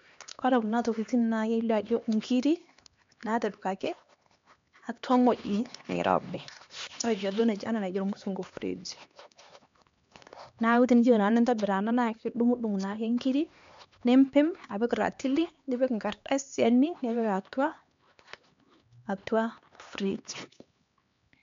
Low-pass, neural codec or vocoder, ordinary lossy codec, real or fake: 7.2 kHz; codec, 16 kHz, 2 kbps, X-Codec, HuBERT features, trained on LibriSpeech; MP3, 64 kbps; fake